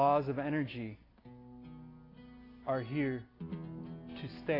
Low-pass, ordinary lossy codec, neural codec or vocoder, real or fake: 5.4 kHz; AAC, 24 kbps; none; real